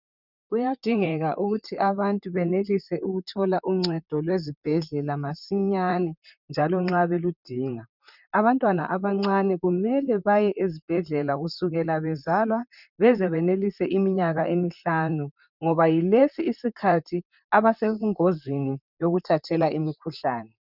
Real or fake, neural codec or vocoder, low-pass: fake; vocoder, 44.1 kHz, 128 mel bands, Pupu-Vocoder; 5.4 kHz